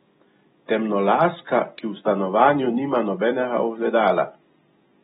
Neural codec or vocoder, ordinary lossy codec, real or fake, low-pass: none; AAC, 16 kbps; real; 19.8 kHz